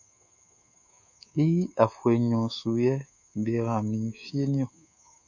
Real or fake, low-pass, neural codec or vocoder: fake; 7.2 kHz; codec, 24 kHz, 3.1 kbps, DualCodec